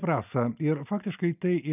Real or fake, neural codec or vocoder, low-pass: real; none; 3.6 kHz